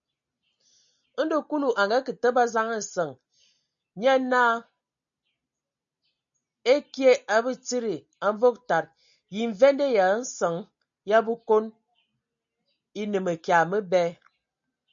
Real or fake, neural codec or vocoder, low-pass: real; none; 7.2 kHz